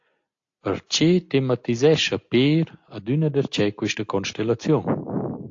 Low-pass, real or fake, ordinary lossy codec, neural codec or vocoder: 7.2 kHz; real; AAC, 48 kbps; none